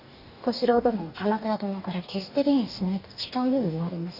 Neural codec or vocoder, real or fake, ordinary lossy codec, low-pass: codec, 44.1 kHz, 2.6 kbps, DAC; fake; none; 5.4 kHz